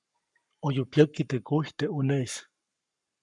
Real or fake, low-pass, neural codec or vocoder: fake; 10.8 kHz; codec, 44.1 kHz, 7.8 kbps, Pupu-Codec